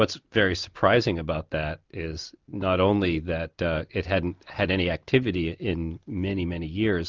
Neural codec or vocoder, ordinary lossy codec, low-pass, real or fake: none; Opus, 24 kbps; 7.2 kHz; real